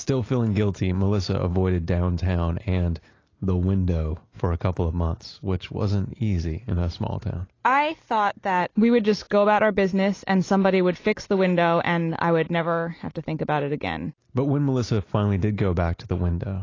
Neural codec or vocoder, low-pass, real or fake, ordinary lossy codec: none; 7.2 kHz; real; AAC, 32 kbps